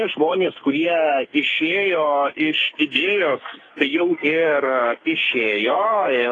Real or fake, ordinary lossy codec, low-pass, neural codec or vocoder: fake; AAC, 32 kbps; 10.8 kHz; codec, 44.1 kHz, 2.6 kbps, SNAC